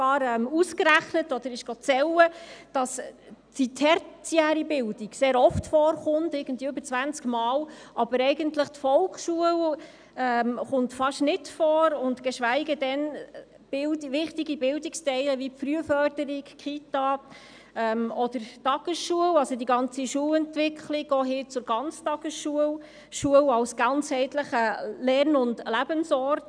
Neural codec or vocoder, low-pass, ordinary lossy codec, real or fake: none; 9.9 kHz; none; real